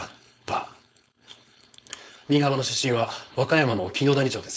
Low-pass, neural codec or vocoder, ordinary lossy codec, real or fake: none; codec, 16 kHz, 4.8 kbps, FACodec; none; fake